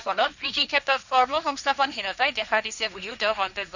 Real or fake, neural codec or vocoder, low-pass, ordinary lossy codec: fake; codec, 16 kHz, 1.1 kbps, Voila-Tokenizer; 7.2 kHz; none